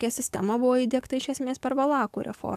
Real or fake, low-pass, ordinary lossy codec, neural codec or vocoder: fake; 14.4 kHz; AAC, 96 kbps; codec, 44.1 kHz, 7.8 kbps, DAC